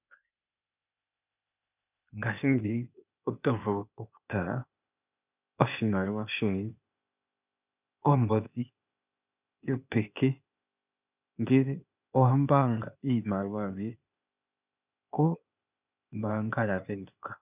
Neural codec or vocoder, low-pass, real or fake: codec, 16 kHz, 0.8 kbps, ZipCodec; 3.6 kHz; fake